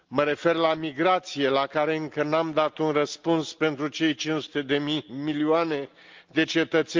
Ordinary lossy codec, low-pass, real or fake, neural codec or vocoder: Opus, 32 kbps; 7.2 kHz; real; none